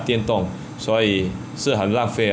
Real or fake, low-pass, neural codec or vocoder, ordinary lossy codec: real; none; none; none